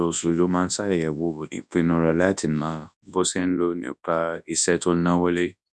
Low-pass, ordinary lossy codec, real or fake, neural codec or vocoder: none; none; fake; codec, 24 kHz, 0.9 kbps, WavTokenizer, large speech release